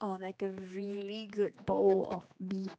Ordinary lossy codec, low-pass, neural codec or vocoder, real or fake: none; none; codec, 16 kHz, 2 kbps, X-Codec, HuBERT features, trained on general audio; fake